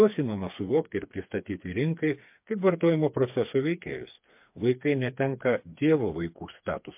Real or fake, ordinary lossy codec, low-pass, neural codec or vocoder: fake; MP3, 32 kbps; 3.6 kHz; codec, 16 kHz, 4 kbps, FreqCodec, smaller model